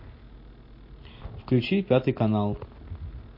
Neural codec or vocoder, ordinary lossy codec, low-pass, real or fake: none; MP3, 32 kbps; 5.4 kHz; real